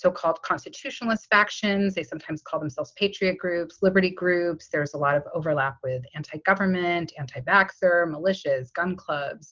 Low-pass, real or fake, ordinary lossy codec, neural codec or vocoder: 7.2 kHz; real; Opus, 24 kbps; none